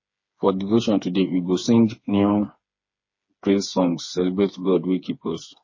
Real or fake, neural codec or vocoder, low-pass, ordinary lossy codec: fake; codec, 16 kHz, 4 kbps, FreqCodec, smaller model; 7.2 kHz; MP3, 32 kbps